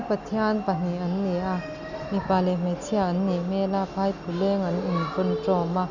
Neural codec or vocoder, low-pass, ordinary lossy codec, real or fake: none; 7.2 kHz; none; real